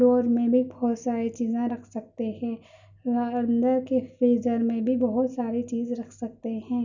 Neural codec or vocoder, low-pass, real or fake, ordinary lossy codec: none; 7.2 kHz; real; none